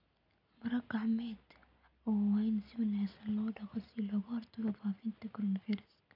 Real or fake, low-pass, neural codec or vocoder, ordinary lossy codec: real; 5.4 kHz; none; none